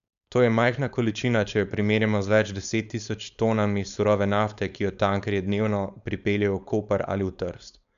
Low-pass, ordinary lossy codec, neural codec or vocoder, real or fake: 7.2 kHz; none; codec, 16 kHz, 4.8 kbps, FACodec; fake